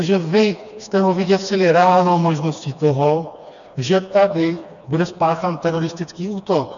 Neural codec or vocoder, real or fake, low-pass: codec, 16 kHz, 2 kbps, FreqCodec, smaller model; fake; 7.2 kHz